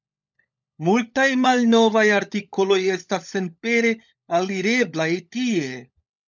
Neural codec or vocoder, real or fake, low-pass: codec, 16 kHz, 16 kbps, FunCodec, trained on LibriTTS, 50 frames a second; fake; 7.2 kHz